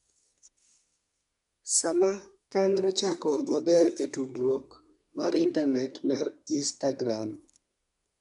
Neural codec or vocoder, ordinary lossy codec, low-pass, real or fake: codec, 24 kHz, 1 kbps, SNAC; none; 10.8 kHz; fake